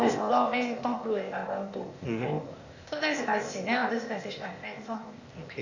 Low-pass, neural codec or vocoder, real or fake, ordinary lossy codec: 7.2 kHz; codec, 16 kHz, 0.8 kbps, ZipCodec; fake; Opus, 64 kbps